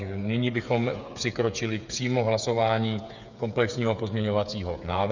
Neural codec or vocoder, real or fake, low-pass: codec, 16 kHz, 8 kbps, FreqCodec, smaller model; fake; 7.2 kHz